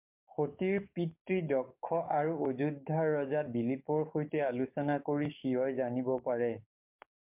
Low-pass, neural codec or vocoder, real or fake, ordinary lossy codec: 3.6 kHz; codec, 44.1 kHz, 7.8 kbps, DAC; fake; MP3, 32 kbps